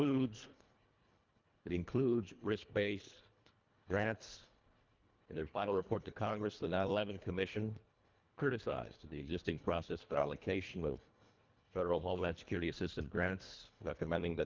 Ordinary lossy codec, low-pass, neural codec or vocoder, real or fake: Opus, 32 kbps; 7.2 kHz; codec, 24 kHz, 1.5 kbps, HILCodec; fake